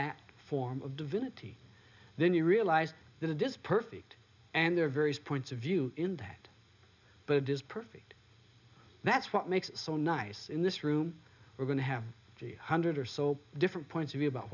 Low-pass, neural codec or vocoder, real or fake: 7.2 kHz; none; real